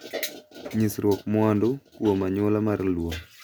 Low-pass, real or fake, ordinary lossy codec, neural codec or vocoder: none; real; none; none